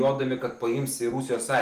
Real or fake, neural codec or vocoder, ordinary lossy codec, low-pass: real; none; Opus, 24 kbps; 14.4 kHz